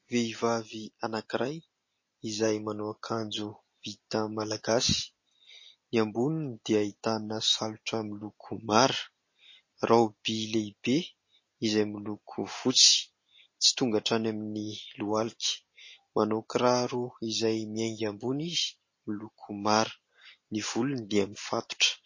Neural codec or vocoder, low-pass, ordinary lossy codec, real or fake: none; 7.2 kHz; MP3, 32 kbps; real